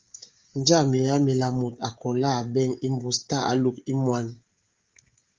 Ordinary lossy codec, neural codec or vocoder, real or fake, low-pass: Opus, 32 kbps; codec, 16 kHz, 16 kbps, FreqCodec, smaller model; fake; 7.2 kHz